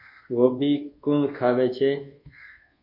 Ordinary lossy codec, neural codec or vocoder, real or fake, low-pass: MP3, 32 kbps; codec, 24 kHz, 1.2 kbps, DualCodec; fake; 5.4 kHz